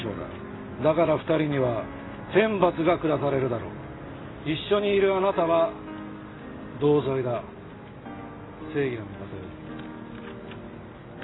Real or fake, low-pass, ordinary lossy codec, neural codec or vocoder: real; 7.2 kHz; AAC, 16 kbps; none